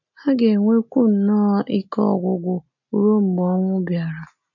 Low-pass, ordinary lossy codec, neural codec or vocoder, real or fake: 7.2 kHz; none; none; real